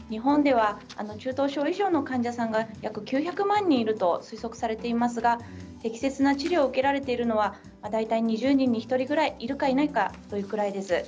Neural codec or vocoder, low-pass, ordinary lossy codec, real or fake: none; none; none; real